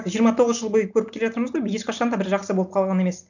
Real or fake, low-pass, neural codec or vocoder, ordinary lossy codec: real; 7.2 kHz; none; none